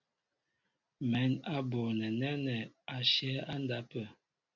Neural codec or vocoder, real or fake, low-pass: none; real; 7.2 kHz